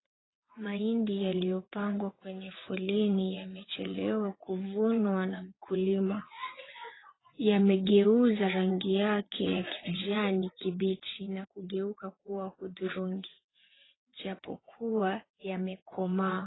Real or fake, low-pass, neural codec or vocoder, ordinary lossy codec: fake; 7.2 kHz; vocoder, 22.05 kHz, 80 mel bands, WaveNeXt; AAC, 16 kbps